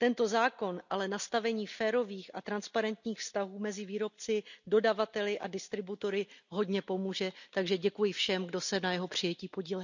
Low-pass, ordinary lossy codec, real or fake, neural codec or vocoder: 7.2 kHz; none; real; none